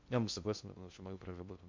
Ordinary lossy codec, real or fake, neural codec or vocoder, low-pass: MP3, 64 kbps; fake; codec, 16 kHz in and 24 kHz out, 0.6 kbps, FocalCodec, streaming, 2048 codes; 7.2 kHz